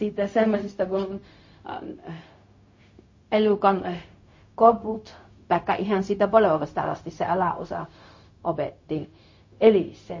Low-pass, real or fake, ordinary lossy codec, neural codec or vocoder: 7.2 kHz; fake; MP3, 32 kbps; codec, 16 kHz, 0.4 kbps, LongCat-Audio-Codec